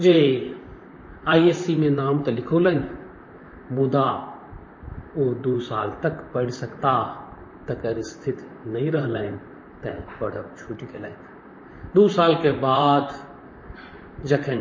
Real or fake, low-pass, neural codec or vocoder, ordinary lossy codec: fake; 7.2 kHz; vocoder, 44.1 kHz, 128 mel bands, Pupu-Vocoder; MP3, 32 kbps